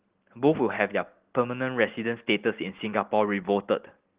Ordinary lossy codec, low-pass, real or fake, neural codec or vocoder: Opus, 16 kbps; 3.6 kHz; real; none